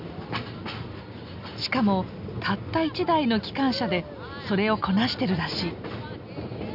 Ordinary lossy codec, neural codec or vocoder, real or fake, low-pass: none; none; real; 5.4 kHz